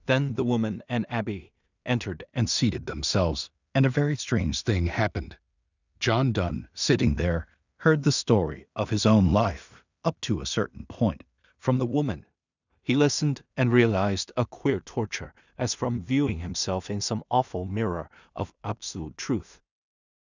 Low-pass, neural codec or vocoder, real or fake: 7.2 kHz; codec, 16 kHz in and 24 kHz out, 0.4 kbps, LongCat-Audio-Codec, two codebook decoder; fake